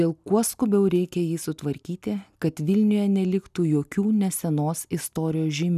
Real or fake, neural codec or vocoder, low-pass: real; none; 14.4 kHz